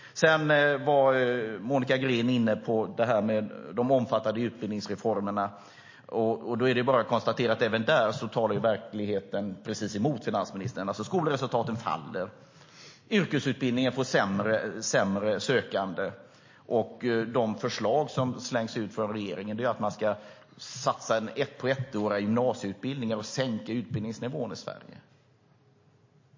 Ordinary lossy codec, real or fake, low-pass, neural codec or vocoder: MP3, 32 kbps; real; 7.2 kHz; none